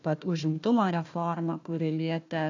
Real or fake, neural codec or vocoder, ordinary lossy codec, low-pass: fake; codec, 16 kHz, 1 kbps, FunCodec, trained on Chinese and English, 50 frames a second; AAC, 48 kbps; 7.2 kHz